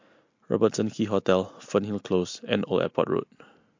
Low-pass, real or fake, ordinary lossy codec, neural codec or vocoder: 7.2 kHz; real; MP3, 48 kbps; none